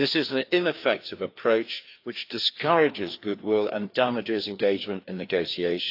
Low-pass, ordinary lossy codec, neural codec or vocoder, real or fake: 5.4 kHz; AAC, 32 kbps; codec, 16 kHz, 2 kbps, FreqCodec, larger model; fake